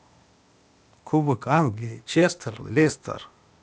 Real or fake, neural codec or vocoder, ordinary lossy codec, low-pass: fake; codec, 16 kHz, 0.8 kbps, ZipCodec; none; none